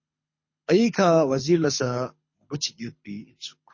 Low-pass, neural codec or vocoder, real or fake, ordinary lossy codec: 7.2 kHz; codec, 24 kHz, 6 kbps, HILCodec; fake; MP3, 32 kbps